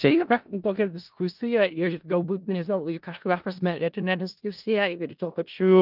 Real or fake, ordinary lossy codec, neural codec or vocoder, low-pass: fake; Opus, 24 kbps; codec, 16 kHz in and 24 kHz out, 0.4 kbps, LongCat-Audio-Codec, four codebook decoder; 5.4 kHz